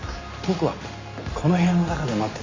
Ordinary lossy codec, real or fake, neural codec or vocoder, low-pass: AAC, 32 kbps; real; none; 7.2 kHz